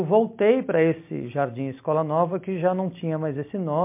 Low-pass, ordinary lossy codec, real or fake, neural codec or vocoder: 3.6 kHz; MP3, 24 kbps; real; none